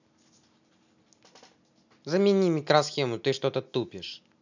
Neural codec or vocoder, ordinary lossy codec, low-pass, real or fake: none; none; 7.2 kHz; real